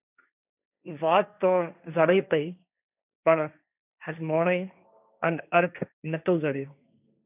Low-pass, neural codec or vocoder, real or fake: 3.6 kHz; codec, 16 kHz, 1.1 kbps, Voila-Tokenizer; fake